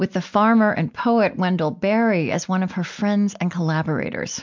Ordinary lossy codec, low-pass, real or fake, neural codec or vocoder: MP3, 64 kbps; 7.2 kHz; real; none